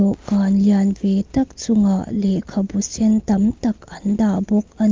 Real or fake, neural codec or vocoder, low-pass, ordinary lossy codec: real; none; 7.2 kHz; Opus, 32 kbps